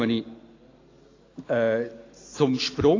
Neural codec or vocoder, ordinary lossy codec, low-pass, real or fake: none; AAC, 32 kbps; 7.2 kHz; real